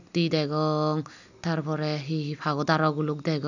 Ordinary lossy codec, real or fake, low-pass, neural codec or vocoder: none; real; 7.2 kHz; none